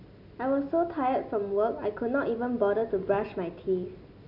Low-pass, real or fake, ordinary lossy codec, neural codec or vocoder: 5.4 kHz; real; none; none